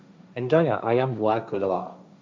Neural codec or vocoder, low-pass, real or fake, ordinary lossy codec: codec, 16 kHz, 1.1 kbps, Voila-Tokenizer; none; fake; none